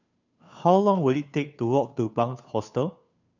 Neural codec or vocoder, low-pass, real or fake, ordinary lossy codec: codec, 16 kHz, 2 kbps, FunCodec, trained on Chinese and English, 25 frames a second; 7.2 kHz; fake; none